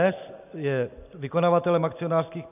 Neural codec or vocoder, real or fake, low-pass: none; real; 3.6 kHz